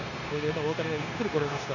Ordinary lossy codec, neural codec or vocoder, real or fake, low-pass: none; codec, 16 kHz, 0.9 kbps, LongCat-Audio-Codec; fake; 7.2 kHz